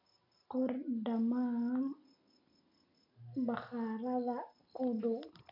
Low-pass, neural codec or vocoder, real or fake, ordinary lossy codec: 5.4 kHz; none; real; none